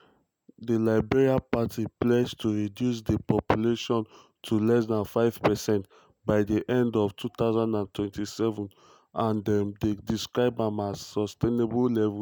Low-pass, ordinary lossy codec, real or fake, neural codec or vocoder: none; none; real; none